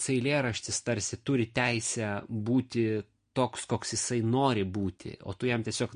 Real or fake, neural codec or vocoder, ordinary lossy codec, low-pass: real; none; MP3, 48 kbps; 9.9 kHz